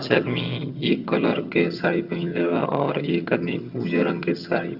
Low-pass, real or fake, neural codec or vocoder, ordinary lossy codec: 5.4 kHz; fake; vocoder, 22.05 kHz, 80 mel bands, HiFi-GAN; Opus, 64 kbps